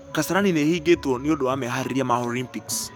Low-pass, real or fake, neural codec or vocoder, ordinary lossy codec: none; fake; codec, 44.1 kHz, 7.8 kbps, DAC; none